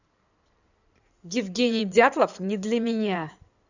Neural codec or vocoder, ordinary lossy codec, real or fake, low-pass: codec, 16 kHz in and 24 kHz out, 2.2 kbps, FireRedTTS-2 codec; none; fake; 7.2 kHz